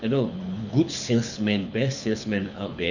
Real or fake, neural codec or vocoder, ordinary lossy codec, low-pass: fake; codec, 24 kHz, 6 kbps, HILCodec; none; 7.2 kHz